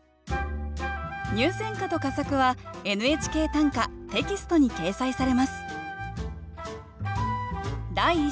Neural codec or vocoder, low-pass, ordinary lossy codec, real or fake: none; none; none; real